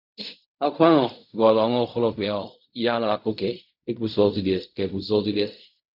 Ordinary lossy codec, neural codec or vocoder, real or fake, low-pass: none; codec, 16 kHz in and 24 kHz out, 0.4 kbps, LongCat-Audio-Codec, fine tuned four codebook decoder; fake; 5.4 kHz